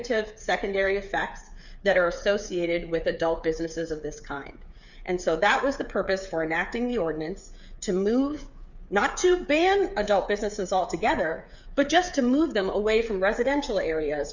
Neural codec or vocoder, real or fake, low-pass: codec, 16 kHz, 8 kbps, FreqCodec, smaller model; fake; 7.2 kHz